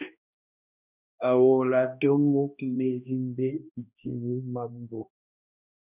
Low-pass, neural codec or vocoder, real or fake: 3.6 kHz; codec, 16 kHz, 1 kbps, X-Codec, HuBERT features, trained on balanced general audio; fake